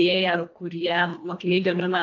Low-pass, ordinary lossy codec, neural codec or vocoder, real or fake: 7.2 kHz; AAC, 48 kbps; codec, 24 kHz, 1.5 kbps, HILCodec; fake